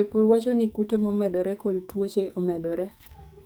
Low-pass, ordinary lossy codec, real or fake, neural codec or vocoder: none; none; fake; codec, 44.1 kHz, 2.6 kbps, SNAC